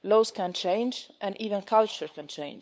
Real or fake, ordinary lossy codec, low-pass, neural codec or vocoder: fake; none; none; codec, 16 kHz, 8 kbps, FunCodec, trained on LibriTTS, 25 frames a second